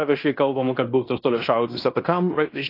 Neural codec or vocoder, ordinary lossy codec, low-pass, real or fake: codec, 16 kHz in and 24 kHz out, 0.9 kbps, LongCat-Audio-Codec, fine tuned four codebook decoder; AAC, 32 kbps; 5.4 kHz; fake